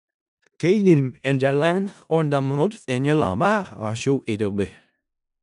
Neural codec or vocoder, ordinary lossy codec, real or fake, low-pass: codec, 16 kHz in and 24 kHz out, 0.4 kbps, LongCat-Audio-Codec, four codebook decoder; none; fake; 10.8 kHz